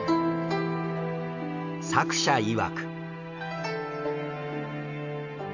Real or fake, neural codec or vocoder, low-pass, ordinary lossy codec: real; none; 7.2 kHz; none